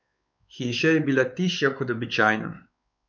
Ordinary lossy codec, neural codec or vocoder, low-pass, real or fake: none; codec, 16 kHz, 4 kbps, X-Codec, WavLM features, trained on Multilingual LibriSpeech; 7.2 kHz; fake